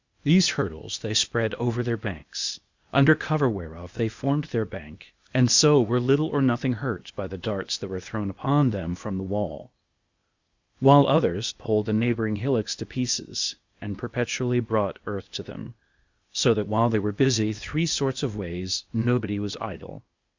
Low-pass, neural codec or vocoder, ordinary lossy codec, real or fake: 7.2 kHz; codec, 16 kHz, 0.8 kbps, ZipCodec; Opus, 64 kbps; fake